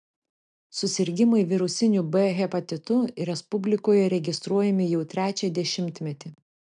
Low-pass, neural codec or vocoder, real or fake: 9.9 kHz; none; real